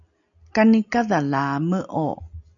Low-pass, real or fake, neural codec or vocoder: 7.2 kHz; real; none